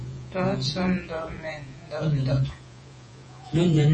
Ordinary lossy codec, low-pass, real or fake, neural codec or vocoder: MP3, 32 kbps; 10.8 kHz; fake; vocoder, 48 kHz, 128 mel bands, Vocos